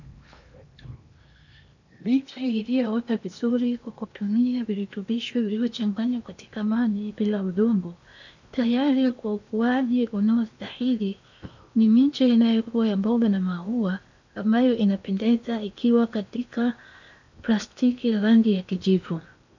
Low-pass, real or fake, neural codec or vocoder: 7.2 kHz; fake; codec, 16 kHz in and 24 kHz out, 0.8 kbps, FocalCodec, streaming, 65536 codes